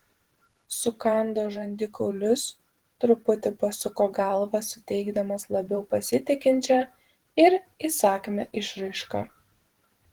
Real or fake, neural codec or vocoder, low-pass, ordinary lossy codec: fake; vocoder, 44.1 kHz, 128 mel bands every 512 samples, BigVGAN v2; 19.8 kHz; Opus, 16 kbps